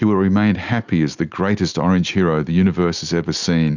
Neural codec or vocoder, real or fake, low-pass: none; real; 7.2 kHz